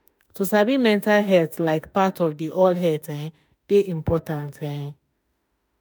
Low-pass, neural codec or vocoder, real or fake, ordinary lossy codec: none; autoencoder, 48 kHz, 32 numbers a frame, DAC-VAE, trained on Japanese speech; fake; none